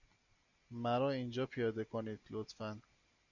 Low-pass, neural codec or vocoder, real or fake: 7.2 kHz; none; real